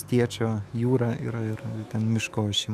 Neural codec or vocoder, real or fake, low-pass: autoencoder, 48 kHz, 128 numbers a frame, DAC-VAE, trained on Japanese speech; fake; 14.4 kHz